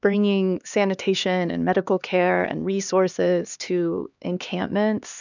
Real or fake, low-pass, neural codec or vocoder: fake; 7.2 kHz; codec, 16 kHz, 2 kbps, X-Codec, HuBERT features, trained on LibriSpeech